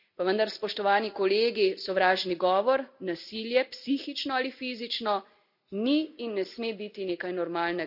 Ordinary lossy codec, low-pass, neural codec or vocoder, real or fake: none; 5.4 kHz; none; real